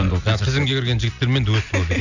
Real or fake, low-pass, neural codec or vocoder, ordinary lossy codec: real; 7.2 kHz; none; none